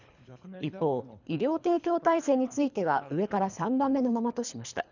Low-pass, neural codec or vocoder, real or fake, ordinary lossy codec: 7.2 kHz; codec, 24 kHz, 3 kbps, HILCodec; fake; none